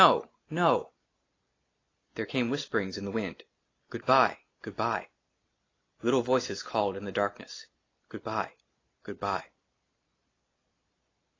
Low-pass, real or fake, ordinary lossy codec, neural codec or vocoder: 7.2 kHz; real; AAC, 32 kbps; none